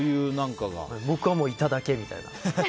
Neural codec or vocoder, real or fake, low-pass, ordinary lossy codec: none; real; none; none